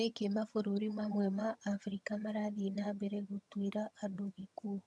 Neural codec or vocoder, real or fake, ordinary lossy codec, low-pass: vocoder, 22.05 kHz, 80 mel bands, HiFi-GAN; fake; none; none